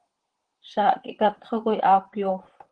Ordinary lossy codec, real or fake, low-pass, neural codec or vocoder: Opus, 16 kbps; fake; 9.9 kHz; vocoder, 44.1 kHz, 128 mel bands, Pupu-Vocoder